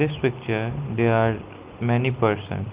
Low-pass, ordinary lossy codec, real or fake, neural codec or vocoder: 3.6 kHz; Opus, 24 kbps; real; none